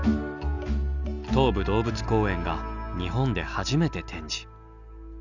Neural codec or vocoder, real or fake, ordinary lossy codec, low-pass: none; real; none; 7.2 kHz